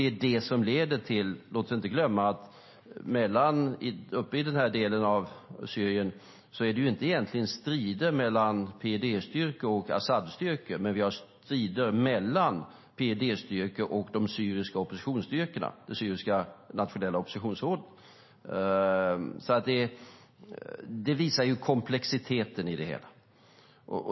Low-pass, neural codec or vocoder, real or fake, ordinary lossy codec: 7.2 kHz; none; real; MP3, 24 kbps